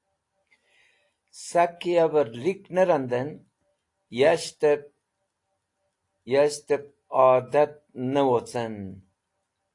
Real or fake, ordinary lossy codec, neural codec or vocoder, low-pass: real; AAC, 48 kbps; none; 10.8 kHz